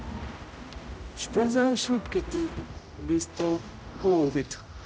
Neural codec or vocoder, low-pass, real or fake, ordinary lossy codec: codec, 16 kHz, 0.5 kbps, X-Codec, HuBERT features, trained on general audio; none; fake; none